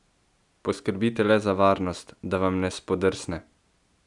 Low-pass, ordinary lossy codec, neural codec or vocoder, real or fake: 10.8 kHz; none; none; real